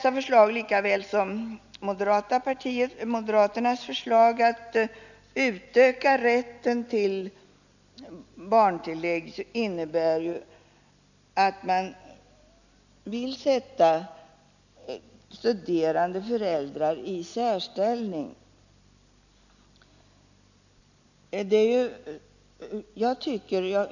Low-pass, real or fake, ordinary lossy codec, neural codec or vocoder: 7.2 kHz; real; none; none